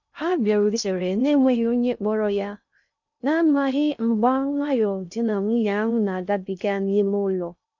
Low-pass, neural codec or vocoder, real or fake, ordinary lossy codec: 7.2 kHz; codec, 16 kHz in and 24 kHz out, 0.6 kbps, FocalCodec, streaming, 2048 codes; fake; none